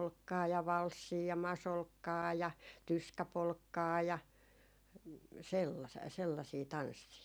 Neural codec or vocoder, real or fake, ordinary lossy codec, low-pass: none; real; none; none